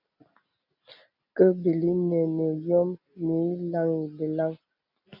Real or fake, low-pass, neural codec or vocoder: real; 5.4 kHz; none